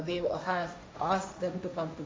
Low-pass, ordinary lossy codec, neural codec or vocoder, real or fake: none; none; codec, 16 kHz, 1.1 kbps, Voila-Tokenizer; fake